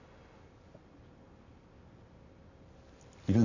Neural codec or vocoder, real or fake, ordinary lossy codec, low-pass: none; real; none; 7.2 kHz